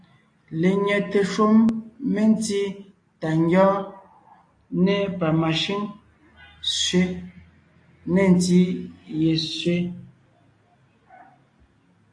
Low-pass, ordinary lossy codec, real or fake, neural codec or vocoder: 9.9 kHz; AAC, 48 kbps; real; none